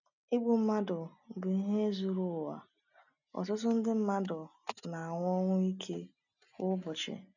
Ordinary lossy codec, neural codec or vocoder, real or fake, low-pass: none; none; real; 7.2 kHz